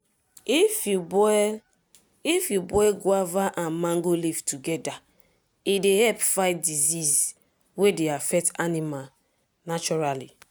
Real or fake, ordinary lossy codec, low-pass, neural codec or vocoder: real; none; none; none